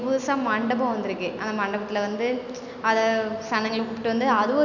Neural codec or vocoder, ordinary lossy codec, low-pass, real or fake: none; none; 7.2 kHz; real